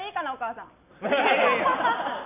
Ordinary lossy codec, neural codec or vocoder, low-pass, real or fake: none; none; 3.6 kHz; real